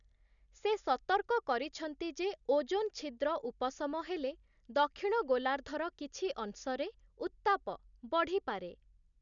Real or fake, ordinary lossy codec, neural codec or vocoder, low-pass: real; none; none; 7.2 kHz